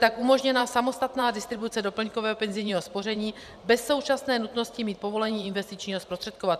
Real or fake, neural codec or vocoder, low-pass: fake; vocoder, 44.1 kHz, 128 mel bands every 512 samples, BigVGAN v2; 14.4 kHz